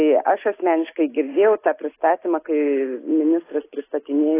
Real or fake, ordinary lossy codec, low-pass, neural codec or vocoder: real; AAC, 16 kbps; 3.6 kHz; none